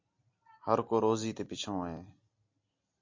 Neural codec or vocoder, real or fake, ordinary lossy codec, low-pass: none; real; MP3, 48 kbps; 7.2 kHz